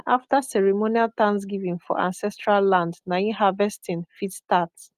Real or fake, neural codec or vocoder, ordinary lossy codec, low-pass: real; none; Opus, 32 kbps; 14.4 kHz